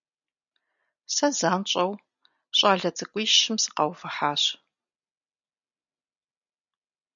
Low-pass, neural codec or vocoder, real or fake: 7.2 kHz; none; real